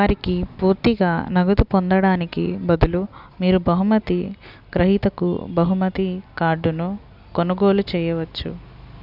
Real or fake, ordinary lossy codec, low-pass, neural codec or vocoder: real; none; 5.4 kHz; none